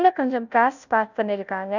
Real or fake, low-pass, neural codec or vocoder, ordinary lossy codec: fake; 7.2 kHz; codec, 16 kHz, 0.5 kbps, FunCodec, trained on Chinese and English, 25 frames a second; none